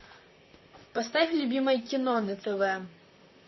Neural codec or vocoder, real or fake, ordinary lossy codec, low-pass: vocoder, 44.1 kHz, 128 mel bands, Pupu-Vocoder; fake; MP3, 24 kbps; 7.2 kHz